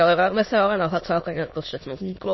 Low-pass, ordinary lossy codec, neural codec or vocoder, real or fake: 7.2 kHz; MP3, 24 kbps; autoencoder, 22.05 kHz, a latent of 192 numbers a frame, VITS, trained on many speakers; fake